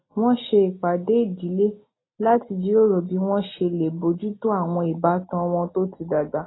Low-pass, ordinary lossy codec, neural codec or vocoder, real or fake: 7.2 kHz; AAC, 16 kbps; none; real